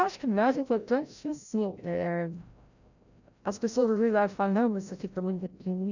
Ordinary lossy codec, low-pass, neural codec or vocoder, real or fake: none; 7.2 kHz; codec, 16 kHz, 0.5 kbps, FreqCodec, larger model; fake